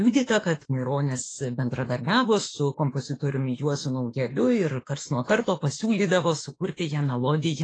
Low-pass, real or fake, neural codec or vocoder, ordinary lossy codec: 9.9 kHz; fake; autoencoder, 48 kHz, 32 numbers a frame, DAC-VAE, trained on Japanese speech; AAC, 32 kbps